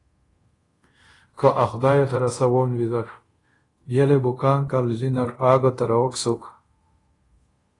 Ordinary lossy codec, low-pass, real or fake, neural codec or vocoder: AAC, 32 kbps; 10.8 kHz; fake; codec, 24 kHz, 0.5 kbps, DualCodec